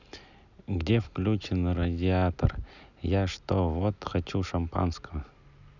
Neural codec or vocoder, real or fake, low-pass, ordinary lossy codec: none; real; 7.2 kHz; none